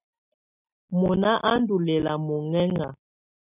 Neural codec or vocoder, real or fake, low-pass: none; real; 3.6 kHz